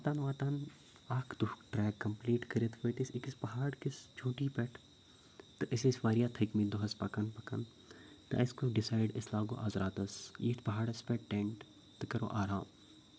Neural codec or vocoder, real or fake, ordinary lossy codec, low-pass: none; real; none; none